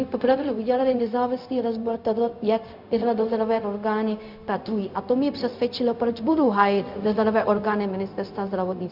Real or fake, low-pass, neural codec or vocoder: fake; 5.4 kHz; codec, 16 kHz, 0.4 kbps, LongCat-Audio-Codec